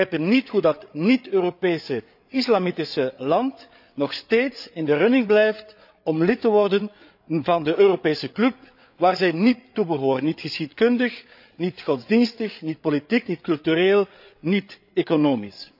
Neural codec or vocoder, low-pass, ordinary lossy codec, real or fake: codec, 16 kHz, 8 kbps, FreqCodec, larger model; 5.4 kHz; none; fake